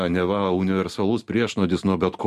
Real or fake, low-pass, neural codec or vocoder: real; 14.4 kHz; none